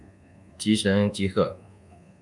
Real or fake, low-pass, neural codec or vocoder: fake; 10.8 kHz; codec, 24 kHz, 1.2 kbps, DualCodec